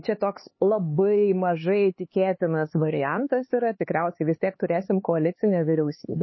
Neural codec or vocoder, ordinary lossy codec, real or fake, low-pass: codec, 16 kHz, 4 kbps, X-Codec, HuBERT features, trained on LibriSpeech; MP3, 24 kbps; fake; 7.2 kHz